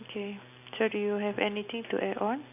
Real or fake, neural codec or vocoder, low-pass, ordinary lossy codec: real; none; 3.6 kHz; none